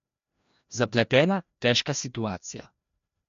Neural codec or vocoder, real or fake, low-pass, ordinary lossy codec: codec, 16 kHz, 1 kbps, FreqCodec, larger model; fake; 7.2 kHz; MP3, 48 kbps